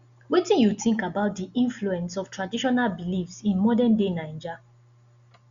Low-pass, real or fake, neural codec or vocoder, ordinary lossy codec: 7.2 kHz; real; none; Opus, 64 kbps